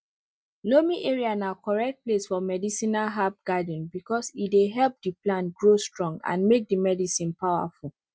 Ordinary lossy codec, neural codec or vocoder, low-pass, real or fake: none; none; none; real